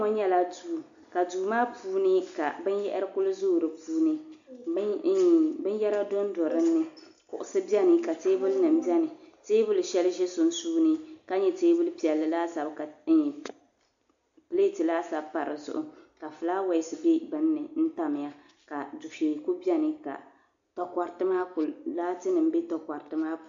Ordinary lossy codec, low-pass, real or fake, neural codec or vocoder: AAC, 64 kbps; 7.2 kHz; real; none